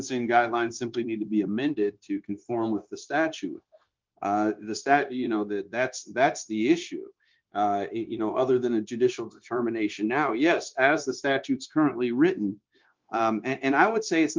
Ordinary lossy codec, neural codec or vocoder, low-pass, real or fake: Opus, 24 kbps; codec, 16 kHz, 0.9 kbps, LongCat-Audio-Codec; 7.2 kHz; fake